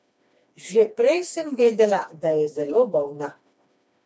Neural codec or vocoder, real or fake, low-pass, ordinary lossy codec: codec, 16 kHz, 2 kbps, FreqCodec, smaller model; fake; none; none